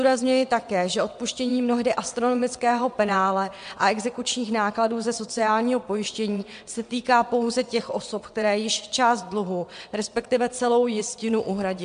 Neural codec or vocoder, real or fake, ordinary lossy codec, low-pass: vocoder, 22.05 kHz, 80 mel bands, WaveNeXt; fake; MP3, 64 kbps; 9.9 kHz